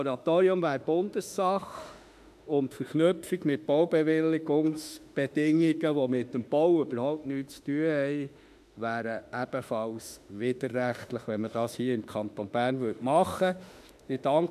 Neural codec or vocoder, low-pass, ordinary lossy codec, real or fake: autoencoder, 48 kHz, 32 numbers a frame, DAC-VAE, trained on Japanese speech; 14.4 kHz; none; fake